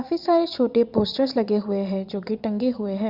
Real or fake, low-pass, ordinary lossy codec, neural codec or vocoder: fake; 5.4 kHz; Opus, 64 kbps; vocoder, 22.05 kHz, 80 mel bands, Vocos